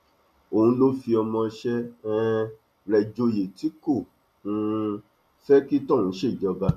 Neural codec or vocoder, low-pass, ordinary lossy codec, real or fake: none; 14.4 kHz; none; real